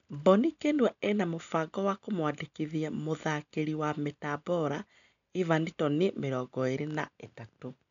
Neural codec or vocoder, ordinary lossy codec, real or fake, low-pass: none; none; real; 7.2 kHz